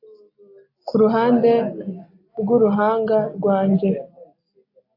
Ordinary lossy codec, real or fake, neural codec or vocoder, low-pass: AAC, 32 kbps; real; none; 5.4 kHz